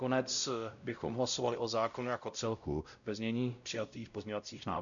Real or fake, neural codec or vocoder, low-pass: fake; codec, 16 kHz, 0.5 kbps, X-Codec, WavLM features, trained on Multilingual LibriSpeech; 7.2 kHz